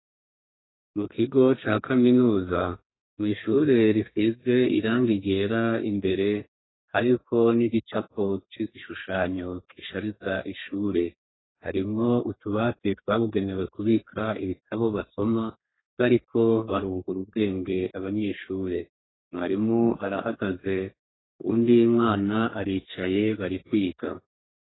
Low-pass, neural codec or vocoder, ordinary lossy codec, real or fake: 7.2 kHz; codec, 32 kHz, 1.9 kbps, SNAC; AAC, 16 kbps; fake